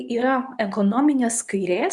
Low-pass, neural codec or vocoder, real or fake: 10.8 kHz; codec, 24 kHz, 0.9 kbps, WavTokenizer, medium speech release version 2; fake